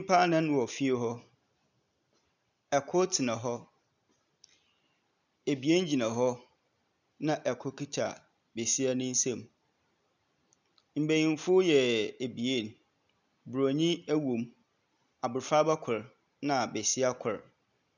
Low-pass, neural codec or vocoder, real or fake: 7.2 kHz; none; real